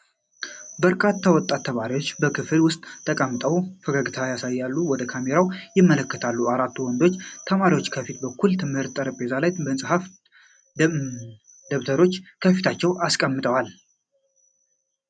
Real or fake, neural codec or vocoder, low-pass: real; none; 9.9 kHz